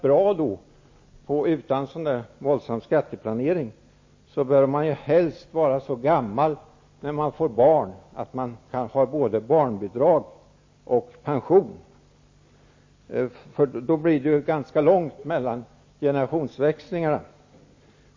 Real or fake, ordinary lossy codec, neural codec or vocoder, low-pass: real; MP3, 32 kbps; none; 7.2 kHz